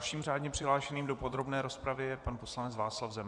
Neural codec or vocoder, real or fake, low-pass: vocoder, 24 kHz, 100 mel bands, Vocos; fake; 10.8 kHz